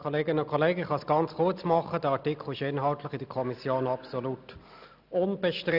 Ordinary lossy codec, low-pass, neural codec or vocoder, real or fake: none; 5.4 kHz; none; real